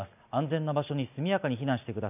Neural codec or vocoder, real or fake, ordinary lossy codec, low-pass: none; real; none; 3.6 kHz